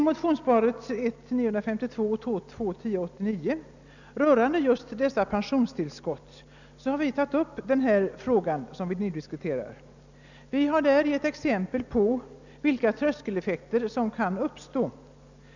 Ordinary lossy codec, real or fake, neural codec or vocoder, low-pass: none; real; none; 7.2 kHz